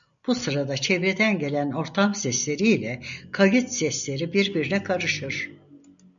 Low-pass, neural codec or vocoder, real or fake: 7.2 kHz; none; real